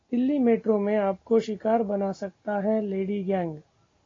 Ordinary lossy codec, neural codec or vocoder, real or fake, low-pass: AAC, 32 kbps; none; real; 7.2 kHz